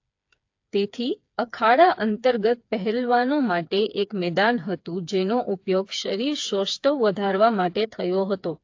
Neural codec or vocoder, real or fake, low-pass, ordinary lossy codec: codec, 16 kHz, 4 kbps, FreqCodec, smaller model; fake; 7.2 kHz; AAC, 48 kbps